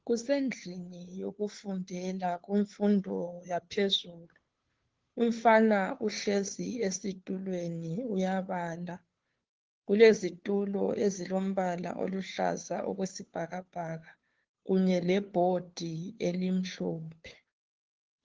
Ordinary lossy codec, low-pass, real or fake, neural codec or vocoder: Opus, 16 kbps; 7.2 kHz; fake; codec, 16 kHz, 2 kbps, FunCodec, trained on Chinese and English, 25 frames a second